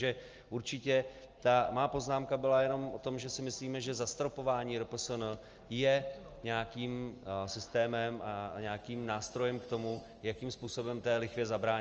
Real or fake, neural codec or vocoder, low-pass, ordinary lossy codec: real; none; 7.2 kHz; Opus, 24 kbps